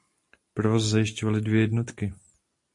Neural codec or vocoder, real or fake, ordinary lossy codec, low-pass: none; real; MP3, 48 kbps; 10.8 kHz